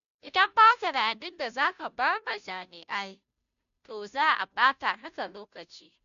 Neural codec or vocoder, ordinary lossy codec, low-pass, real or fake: codec, 16 kHz, 0.5 kbps, FunCodec, trained on Chinese and English, 25 frames a second; none; 7.2 kHz; fake